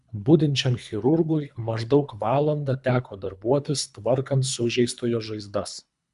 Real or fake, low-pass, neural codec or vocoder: fake; 10.8 kHz; codec, 24 kHz, 3 kbps, HILCodec